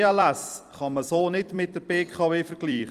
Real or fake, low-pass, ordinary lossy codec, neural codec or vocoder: fake; 14.4 kHz; Opus, 32 kbps; vocoder, 44.1 kHz, 128 mel bands every 256 samples, BigVGAN v2